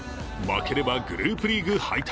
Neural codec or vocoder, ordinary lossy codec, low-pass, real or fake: none; none; none; real